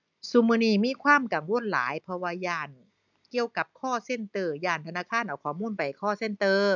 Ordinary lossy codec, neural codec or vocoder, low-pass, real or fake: none; none; 7.2 kHz; real